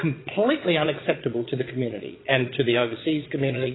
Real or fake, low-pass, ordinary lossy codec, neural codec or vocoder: fake; 7.2 kHz; AAC, 16 kbps; vocoder, 22.05 kHz, 80 mel bands, Vocos